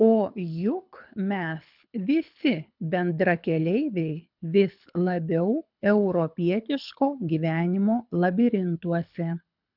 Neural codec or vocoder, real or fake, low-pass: codec, 24 kHz, 6 kbps, HILCodec; fake; 5.4 kHz